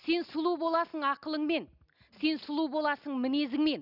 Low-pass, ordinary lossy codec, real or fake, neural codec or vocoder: 5.4 kHz; none; real; none